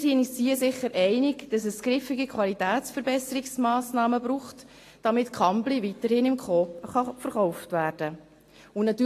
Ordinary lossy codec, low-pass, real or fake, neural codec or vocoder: AAC, 48 kbps; 14.4 kHz; real; none